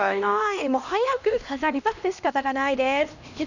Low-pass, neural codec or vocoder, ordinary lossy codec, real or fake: 7.2 kHz; codec, 16 kHz, 1 kbps, X-Codec, HuBERT features, trained on LibriSpeech; none; fake